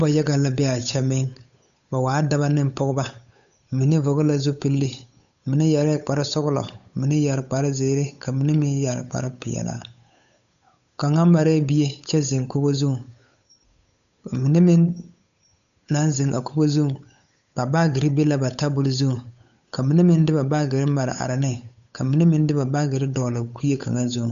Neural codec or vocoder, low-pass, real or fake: codec, 16 kHz, 8 kbps, FunCodec, trained on Chinese and English, 25 frames a second; 7.2 kHz; fake